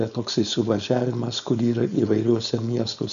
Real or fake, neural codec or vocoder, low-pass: fake; codec, 16 kHz, 4.8 kbps, FACodec; 7.2 kHz